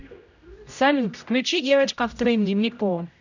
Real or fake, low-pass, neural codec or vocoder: fake; 7.2 kHz; codec, 16 kHz, 0.5 kbps, X-Codec, HuBERT features, trained on general audio